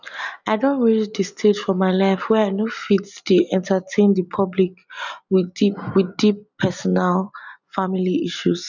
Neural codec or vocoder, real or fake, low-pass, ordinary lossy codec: none; real; 7.2 kHz; none